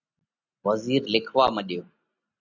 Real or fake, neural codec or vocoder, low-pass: real; none; 7.2 kHz